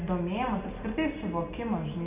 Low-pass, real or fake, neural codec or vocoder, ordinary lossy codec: 3.6 kHz; real; none; Opus, 64 kbps